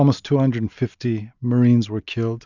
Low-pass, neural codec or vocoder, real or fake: 7.2 kHz; none; real